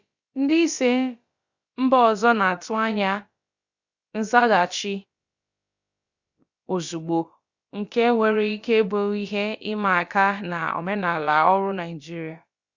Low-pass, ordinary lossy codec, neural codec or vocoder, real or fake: 7.2 kHz; Opus, 64 kbps; codec, 16 kHz, about 1 kbps, DyCAST, with the encoder's durations; fake